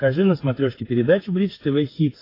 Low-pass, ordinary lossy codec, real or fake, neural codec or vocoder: 5.4 kHz; AAC, 24 kbps; fake; codec, 16 kHz, 16 kbps, FreqCodec, smaller model